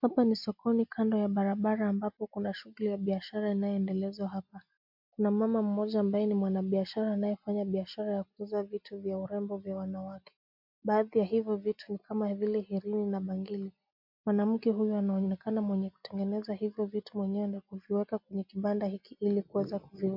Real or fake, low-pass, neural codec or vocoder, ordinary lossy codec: real; 5.4 kHz; none; MP3, 48 kbps